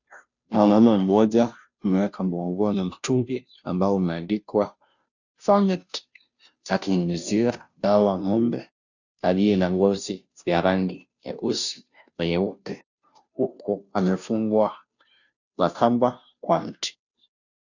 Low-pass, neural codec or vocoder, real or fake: 7.2 kHz; codec, 16 kHz, 0.5 kbps, FunCodec, trained on Chinese and English, 25 frames a second; fake